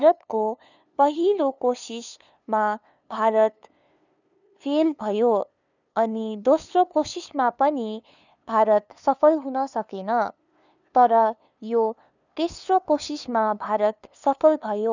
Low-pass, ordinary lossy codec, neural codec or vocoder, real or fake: 7.2 kHz; none; codec, 16 kHz, 2 kbps, FunCodec, trained on LibriTTS, 25 frames a second; fake